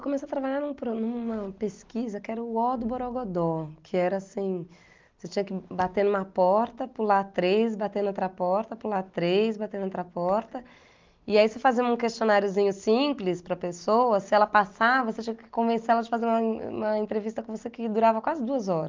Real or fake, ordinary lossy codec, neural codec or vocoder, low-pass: real; Opus, 32 kbps; none; 7.2 kHz